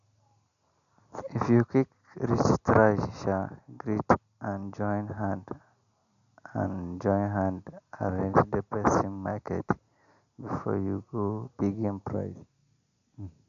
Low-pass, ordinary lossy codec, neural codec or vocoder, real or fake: 7.2 kHz; none; none; real